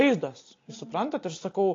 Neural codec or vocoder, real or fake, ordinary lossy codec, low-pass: none; real; AAC, 32 kbps; 7.2 kHz